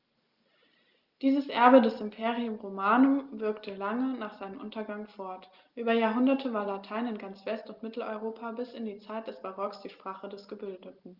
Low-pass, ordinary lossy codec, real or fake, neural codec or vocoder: 5.4 kHz; Opus, 32 kbps; real; none